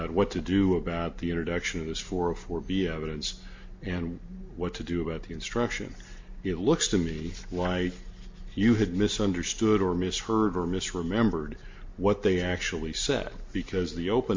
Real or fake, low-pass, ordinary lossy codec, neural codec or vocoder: real; 7.2 kHz; MP3, 48 kbps; none